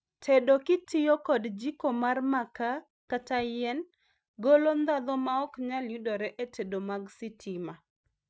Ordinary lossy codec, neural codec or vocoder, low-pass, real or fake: none; none; none; real